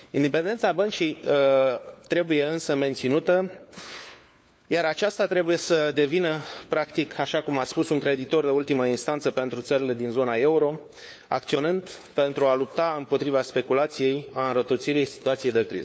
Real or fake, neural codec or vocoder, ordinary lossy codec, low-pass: fake; codec, 16 kHz, 4 kbps, FunCodec, trained on LibriTTS, 50 frames a second; none; none